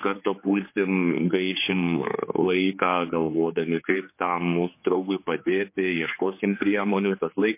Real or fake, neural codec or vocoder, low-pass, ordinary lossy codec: fake; codec, 16 kHz, 4 kbps, X-Codec, HuBERT features, trained on general audio; 3.6 kHz; MP3, 24 kbps